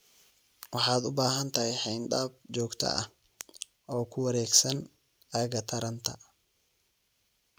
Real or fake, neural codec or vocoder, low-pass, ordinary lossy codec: real; none; none; none